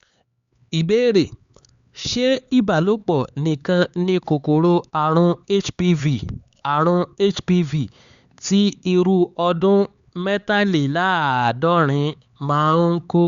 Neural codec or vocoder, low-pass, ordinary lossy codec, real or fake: codec, 16 kHz, 4 kbps, X-Codec, HuBERT features, trained on LibriSpeech; 7.2 kHz; Opus, 64 kbps; fake